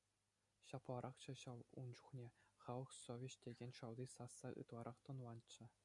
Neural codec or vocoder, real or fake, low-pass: none; real; 10.8 kHz